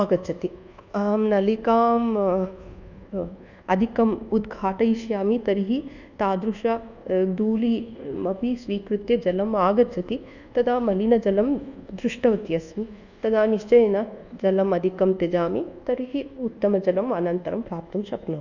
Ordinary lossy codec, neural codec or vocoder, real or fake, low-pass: none; codec, 24 kHz, 1.2 kbps, DualCodec; fake; 7.2 kHz